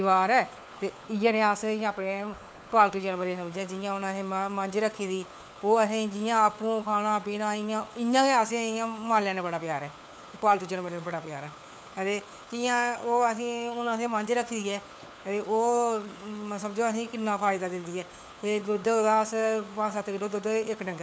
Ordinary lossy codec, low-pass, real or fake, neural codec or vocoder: none; none; fake; codec, 16 kHz, 4 kbps, FunCodec, trained on LibriTTS, 50 frames a second